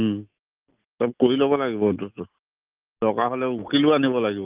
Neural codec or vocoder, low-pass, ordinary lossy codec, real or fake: none; 3.6 kHz; Opus, 24 kbps; real